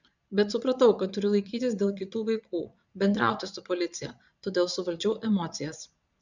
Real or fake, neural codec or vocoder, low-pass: fake; vocoder, 22.05 kHz, 80 mel bands, Vocos; 7.2 kHz